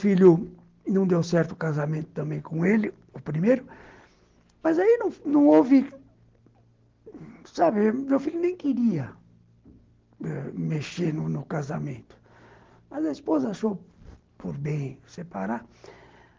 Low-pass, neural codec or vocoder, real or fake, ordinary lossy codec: 7.2 kHz; none; real; Opus, 16 kbps